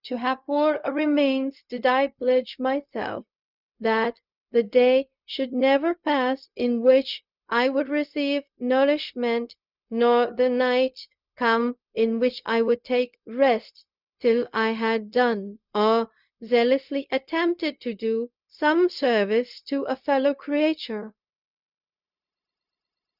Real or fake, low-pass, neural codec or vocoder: fake; 5.4 kHz; codec, 16 kHz, 0.4 kbps, LongCat-Audio-Codec